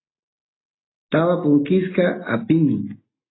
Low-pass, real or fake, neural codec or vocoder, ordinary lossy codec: 7.2 kHz; real; none; AAC, 16 kbps